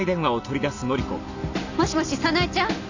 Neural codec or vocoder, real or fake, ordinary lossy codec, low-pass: none; real; none; 7.2 kHz